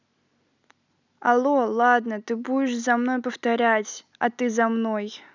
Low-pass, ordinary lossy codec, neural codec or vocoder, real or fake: 7.2 kHz; none; none; real